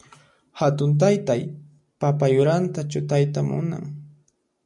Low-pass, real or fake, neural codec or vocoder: 10.8 kHz; real; none